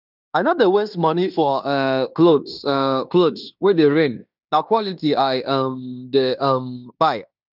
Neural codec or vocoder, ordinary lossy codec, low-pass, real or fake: codec, 16 kHz in and 24 kHz out, 0.9 kbps, LongCat-Audio-Codec, fine tuned four codebook decoder; none; 5.4 kHz; fake